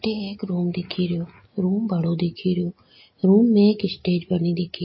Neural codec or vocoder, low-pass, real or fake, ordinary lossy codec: none; 7.2 kHz; real; MP3, 24 kbps